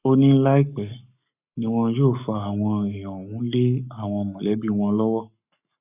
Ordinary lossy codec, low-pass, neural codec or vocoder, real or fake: none; 3.6 kHz; codec, 44.1 kHz, 7.8 kbps, Pupu-Codec; fake